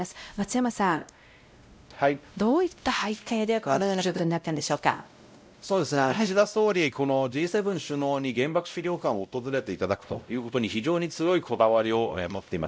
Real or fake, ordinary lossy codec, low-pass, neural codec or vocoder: fake; none; none; codec, 16 kHz, 0.5 kbps, X-Codec, WavLM features, trained on Multilingual LibriSpeech